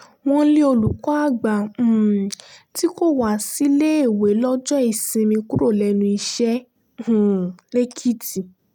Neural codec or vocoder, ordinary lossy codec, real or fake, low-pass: none; none; real; 19.8 kHz